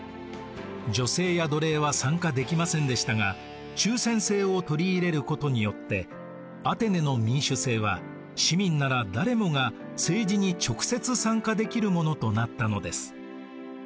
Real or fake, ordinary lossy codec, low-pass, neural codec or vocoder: real; none; none; none